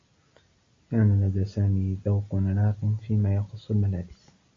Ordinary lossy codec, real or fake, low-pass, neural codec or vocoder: MP3, 32 kbps; real; 7.2 kHz; none